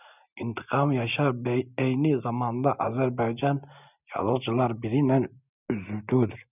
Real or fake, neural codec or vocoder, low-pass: real; none; 3.6 kHz